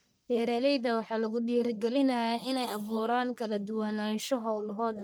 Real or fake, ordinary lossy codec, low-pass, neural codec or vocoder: fake; none; none; codec, 44.1 kHz, 1.7 kbps, Pupu-Codec